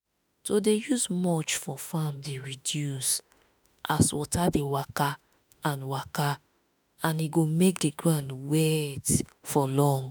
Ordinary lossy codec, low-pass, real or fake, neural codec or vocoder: none; none; fake; autoencoder, 48 kHz, 32 numbers a frame, DAC-VAE, trained on Japanese speech